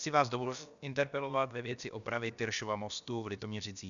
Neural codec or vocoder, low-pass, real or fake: codec, 16 kHz, about 1 kbps, DyCAST, with the encoder's durations; 7.2 kHz; fake